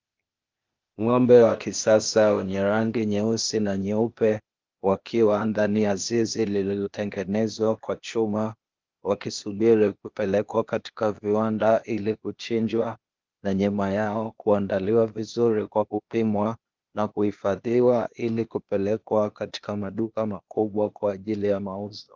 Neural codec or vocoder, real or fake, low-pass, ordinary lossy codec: codec, 16 kHz, 0.8 kbps, ZipCodec; fake; 7.2 kHz; Opus, 32 kbps